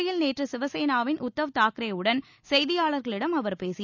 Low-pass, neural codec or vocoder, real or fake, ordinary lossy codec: 7.2 kHz; none; real; none